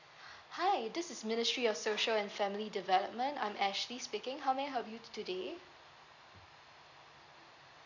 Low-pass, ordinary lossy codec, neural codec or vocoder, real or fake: 7.2 kHz; none; none; real